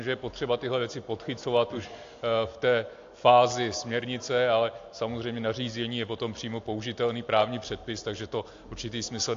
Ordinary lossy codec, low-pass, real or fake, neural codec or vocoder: AAC, 48 kbps; 7.2 kHz; real; none